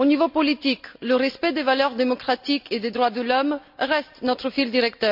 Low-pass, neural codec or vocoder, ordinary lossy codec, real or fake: 5.4 kHz; none; MP3, 48 kbps; real